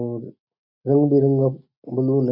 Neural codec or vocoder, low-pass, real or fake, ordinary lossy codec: none; 5.4 kHz; real; none